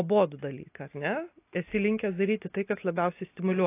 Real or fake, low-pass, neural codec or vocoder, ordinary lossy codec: real; 3.6 kHz; none; AAC, 24 kbps